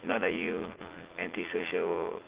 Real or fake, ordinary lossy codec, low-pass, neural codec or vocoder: fake; Opus, 16 kbps; 3.6 kHz; vocoder, 44.1 kHz, 80 mel bands, Vocos